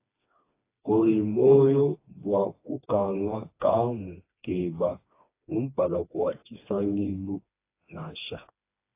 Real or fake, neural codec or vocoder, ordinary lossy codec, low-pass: fake; codec, 16 kHz, 2 kbps, FreqCodec, smaller model; AAC, 24 kbps; 3.6 kHz